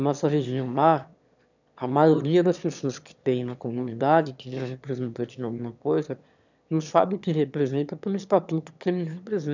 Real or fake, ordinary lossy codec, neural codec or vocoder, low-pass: fake; none; autoencoder, 22.05 kHz, a latent of 192 numbers a frame, VITS, trained on one speaker; 7.2 kHz